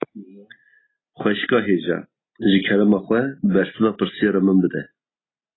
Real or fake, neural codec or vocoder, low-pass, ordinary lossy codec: real; none; 7.2 kHz; AAC, 16 kbps